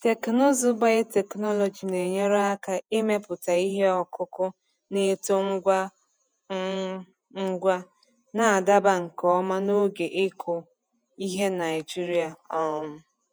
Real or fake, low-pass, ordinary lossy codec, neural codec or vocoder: fake; none; none; vocoder, 48 kHz, 128 mel bands, Vocos